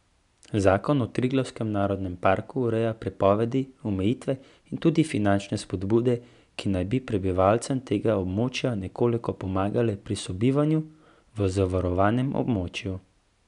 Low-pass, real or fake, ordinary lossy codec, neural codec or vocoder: 10.8 kHz; real; none; none